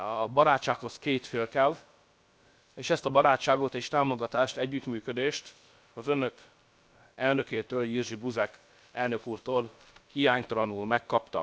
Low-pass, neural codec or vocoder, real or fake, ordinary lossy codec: none; codec, 16 kHz, about 1 kbps, DyCAST, with the encoder's durations; fake; none